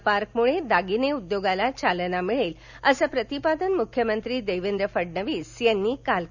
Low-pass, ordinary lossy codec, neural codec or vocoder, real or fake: 7.2 kHz; none; none; real